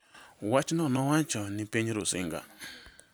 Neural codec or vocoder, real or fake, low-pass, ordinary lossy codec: vocoder, 44.1 kHz, 128 mel bands every 256 samples, BigVGAN v2; fake; none; none